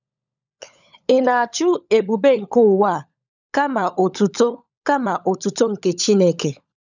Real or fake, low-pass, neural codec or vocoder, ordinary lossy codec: fake; 7.2 kHz; codec, 16 kHz, 16 kbps, FunCodec, trained on LibriTTS, 50 frames a second; none